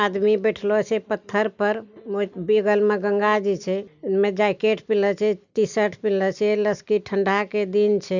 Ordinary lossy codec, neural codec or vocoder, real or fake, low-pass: none; none; real; 7.2 kHz